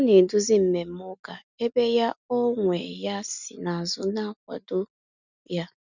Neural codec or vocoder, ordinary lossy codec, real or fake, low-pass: none; none; real; 7.2 kHz